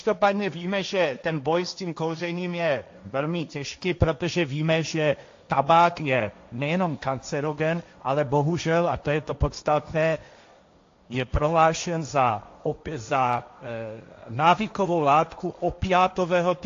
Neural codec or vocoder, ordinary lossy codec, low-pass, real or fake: codec, 16 kHz, 1.1 kbps, Voila-Tokenizer; AAC, 48 kbps; 7.2 kHz; fake